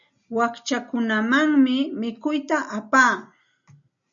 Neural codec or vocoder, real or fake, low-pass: none; real; 7.2 kHz